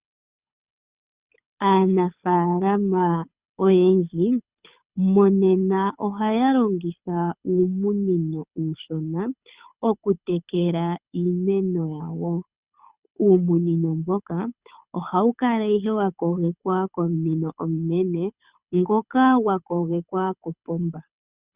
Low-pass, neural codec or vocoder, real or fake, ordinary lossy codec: 3.6 kHz; codec, 24 kHz, 6 kbps, HILCodec; fake; Opus, 64 kbps